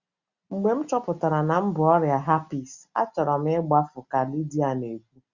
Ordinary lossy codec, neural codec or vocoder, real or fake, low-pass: Opus, 64 kbps; none; real; 7.2 kHz